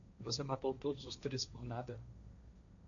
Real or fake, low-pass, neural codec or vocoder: fake; 7.2 kHz; codec, 16 kHz, 1.1 kbps, Voila-Tokenizer